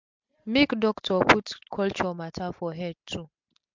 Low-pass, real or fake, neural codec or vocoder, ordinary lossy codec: 7.2 kHz; real; none; MP3, 64 kbps